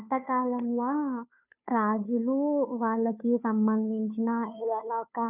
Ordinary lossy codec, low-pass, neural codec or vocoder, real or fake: none; 3.6 kHz; codec, 16 kHz, 2 kbps, FunCodec, trained on Chinese and English, 25 frames a second; fake